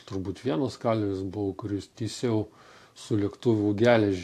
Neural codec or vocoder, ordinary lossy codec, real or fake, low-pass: none; AAC, 64 kbps; real; 14.4 kHz